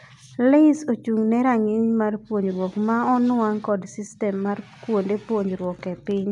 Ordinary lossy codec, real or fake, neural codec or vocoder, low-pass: none; real; none; 10.8 kHz